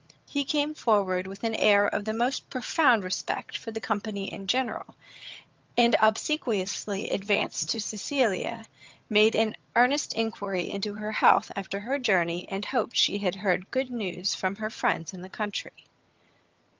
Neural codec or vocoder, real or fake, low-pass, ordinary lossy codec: vocoder, 22.05 kHz, 80 mel bands, HiFi-GAN; fake; 7.2 kHz; Opus, 24 kbps